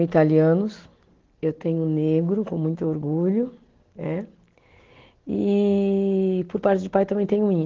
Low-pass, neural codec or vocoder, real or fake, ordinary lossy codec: 7.2 kHz; none; real; Opus, 16 kbps